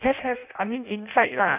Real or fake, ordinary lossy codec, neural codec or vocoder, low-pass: fake; none; codec, 16 kHz in and 24 kHz out, 0.6 kbps, FireRedTTS-2 codec; 3.6 kHz